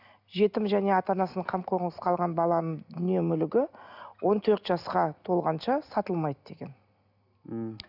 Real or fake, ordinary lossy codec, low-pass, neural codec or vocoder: real; none; 5.4 kHz; none